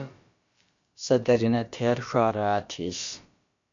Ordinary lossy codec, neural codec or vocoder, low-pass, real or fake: MP3, 48 kbps; codec, 16 kHz, about 1 kbps, DyCAST, with the encoder's durations; 7.2 kHz; fake